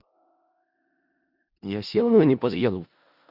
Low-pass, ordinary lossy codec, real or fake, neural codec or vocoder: 5.4 kHz; Opus, 64 kbps; fake; codec, 16 kHz in and 24 kHz out, 0.4 kbps, LongCat-Audio-Codec, four codebook decoder